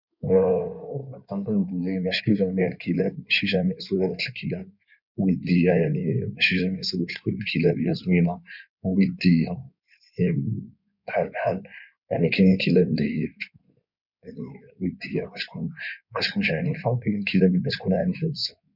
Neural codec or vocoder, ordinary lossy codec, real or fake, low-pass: codec, 16 kHz in and 24 kHz out, 2.2 kbps, FireRedTTS-2 codec; none; fake; 5.4 kHz